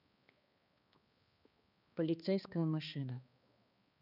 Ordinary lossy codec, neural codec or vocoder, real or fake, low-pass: none; codec, 16 kHz, 2 kbps, X-Codec, HuBERT features, trained on balanced general audio; fake; 5.4 kHz